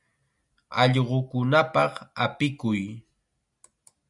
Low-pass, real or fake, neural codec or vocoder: 10.8 kHz; real; none